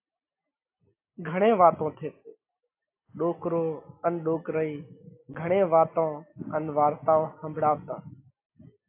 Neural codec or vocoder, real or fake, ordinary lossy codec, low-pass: none; real; AAC, 24 kbps; 3.6 kHz